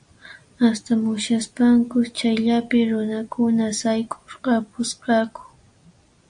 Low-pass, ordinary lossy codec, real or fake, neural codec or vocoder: 9.9 kHz; AAC, 64 kbps; real; none